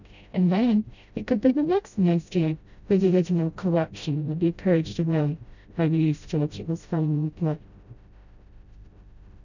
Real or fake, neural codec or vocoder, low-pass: fake; codec, 16 kHz, 0.5 kbps, FreqCodec, smaller model; 7.2 kHz